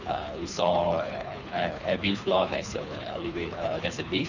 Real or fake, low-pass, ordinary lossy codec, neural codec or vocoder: fake; 7.2 kHz; none; codec, 24 kHz, 3 kbps, HILCodec